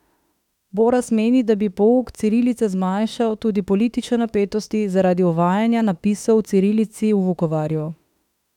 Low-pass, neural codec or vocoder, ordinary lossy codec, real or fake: 19.8 kHz; autoencoder, 48 kHz, 32 numbers a frame, DAC-VAE, trained on Japanese speech; none; fake